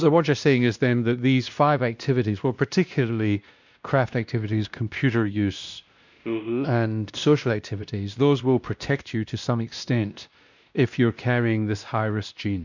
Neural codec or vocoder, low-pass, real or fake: codec, 16 kHz, 1 kbps, X-Codec, WavLM features, trained on Multilingual LibriSpeech; 7.2 kHz; fake